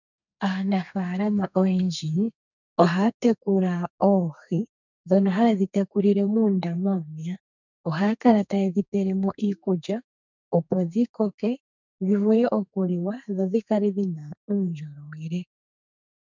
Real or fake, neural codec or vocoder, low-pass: fake; codec, 32 kHz, 1.9 kbps, SNAC; 7.2 kHz